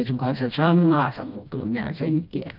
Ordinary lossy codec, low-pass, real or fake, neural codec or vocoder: none; 5.4 kHz; fake; codec, 16 kHz, 1 kbps, FreqCodec, smaller model